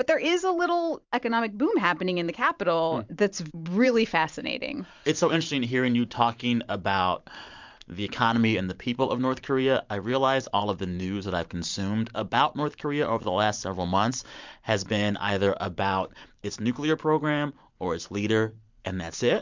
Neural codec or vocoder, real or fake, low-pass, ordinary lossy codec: vocoder, 44.1 kHz, 128 mel bands every 512 samples, BigVGAN v2; fake; 7.2 kHz; MP3, 64 kbps